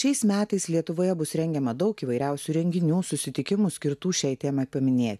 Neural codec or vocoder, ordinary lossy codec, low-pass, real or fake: none; MP3, 96 kbps; 14.4 kHz; real